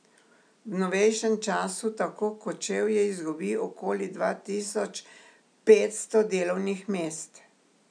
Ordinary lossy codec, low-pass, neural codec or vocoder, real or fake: MP3, 96 kbps; 9.9 kHz; none; real